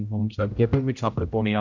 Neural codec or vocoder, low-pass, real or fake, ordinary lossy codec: codec, 16 kHz, 0.5 kbps, X-Codec, HuBERT features, trained on general audio; 7.2 kHz; fake; none